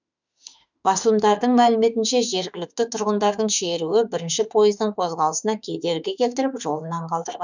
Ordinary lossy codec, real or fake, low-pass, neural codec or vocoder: none; fake; 7.2 kHz; autoencoder, 48 kHz, 32 numbers a frame, DAC-VAE, trained on Japanese speech